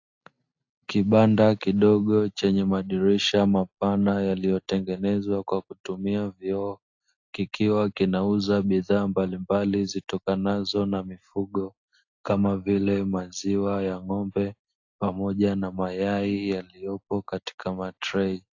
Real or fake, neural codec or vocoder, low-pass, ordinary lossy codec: real; none; 7.2 kHz; AAC, 48 kbps